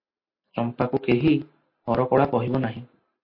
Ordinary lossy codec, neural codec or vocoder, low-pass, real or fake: MP3, 32 kbps; none; 5.4 kHz; real